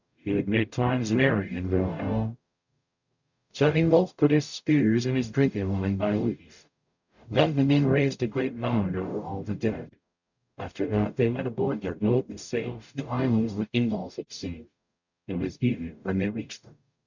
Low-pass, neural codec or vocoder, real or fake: 7.2 kHz; codec, 44.1 kHz, 0.9 kbps, DAC; fake